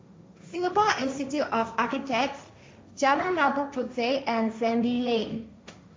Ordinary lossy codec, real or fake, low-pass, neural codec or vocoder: none; fake; none; codec, 16 kHz, 1.1 kbps, Voila-Tokenizer